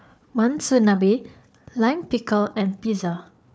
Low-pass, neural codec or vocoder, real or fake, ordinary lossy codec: none; codec, 16 kHz, 4 kbps, FunCodec, trained on Chinese and English, 50 frames a second; fake; none